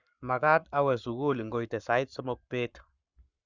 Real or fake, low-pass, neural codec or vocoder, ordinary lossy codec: fake; 7.2 kHz; codec, 44.1 kHz, 7.8 kbps, Pupu-Codec; Opus, 64 kbps